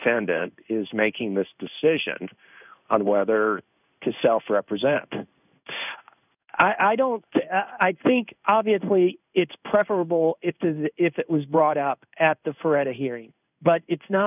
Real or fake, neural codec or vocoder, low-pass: fake; codec, 16 kHz in and 24 kHz out, 1 kbps, XY-Tokenizer; 3.6 kHz